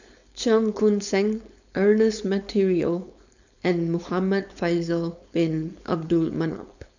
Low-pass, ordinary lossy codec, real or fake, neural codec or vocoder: 7.2 kHz; none; fake; codec, 16 kHz, 4.8 kbps, FACodec